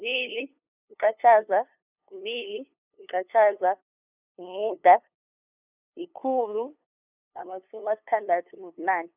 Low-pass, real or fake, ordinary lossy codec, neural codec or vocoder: 3.6 kHz; fake; none; codec, 16 kHz, 2 kbps, FunCodec, trained on LibriTTS, 25 frames a second